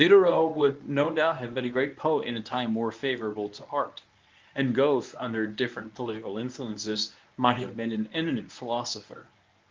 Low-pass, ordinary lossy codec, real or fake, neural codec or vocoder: 7.2 kHz; Opus, 24 kbps; fake; codec, 24 kHz, 0.9 kbps, WavTokenizer, medium speech release version 1